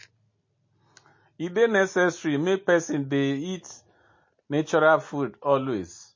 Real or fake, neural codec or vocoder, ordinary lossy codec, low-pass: real; none; MP3, 32 kbps; 7.2 kHz